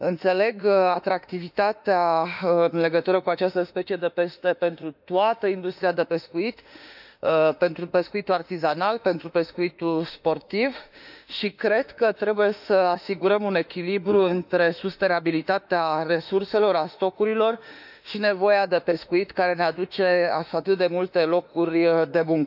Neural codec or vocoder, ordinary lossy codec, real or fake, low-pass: autoencoder, 48 kHz, 32 numbers a frame, DAC-VAE, trained on Japanese speech; none; fake; 5.4 kHz